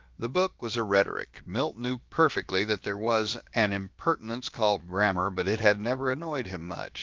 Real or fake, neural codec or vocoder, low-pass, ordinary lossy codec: fake; codec, 16 kHz, about 1 kbps, DyCAST, with the encoder's durations; 7.2 kHz; Opus, 24 kbps